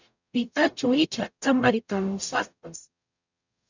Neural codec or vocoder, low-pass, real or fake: codec, 44.1 kHz, 0.9 kbps, DAC; 7.2 kHz; fake